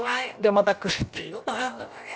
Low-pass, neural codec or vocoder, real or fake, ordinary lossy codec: none; codec, 16 kHz, about 1 kbps, DyCAST, with the encoder's durations; fake; none